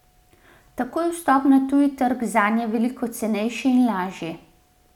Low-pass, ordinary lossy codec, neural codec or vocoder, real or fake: 19.8 kHz; none; none; real